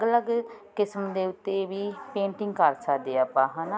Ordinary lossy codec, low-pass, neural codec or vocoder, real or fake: none; none; none; real